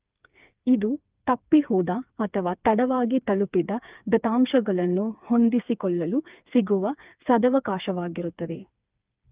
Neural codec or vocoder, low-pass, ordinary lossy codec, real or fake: codec, 16 kHz, 4 kbps, FreqCodec, smaller model; 3.6 kHz; Opus, 24 kbps; fake